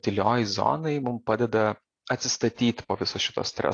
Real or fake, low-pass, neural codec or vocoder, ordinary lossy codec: real; 9.9 kHz; none; AAC, 48 kbps